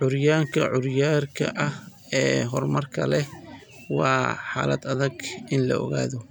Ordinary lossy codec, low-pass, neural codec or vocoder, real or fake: none; 19.8 kHz; none; real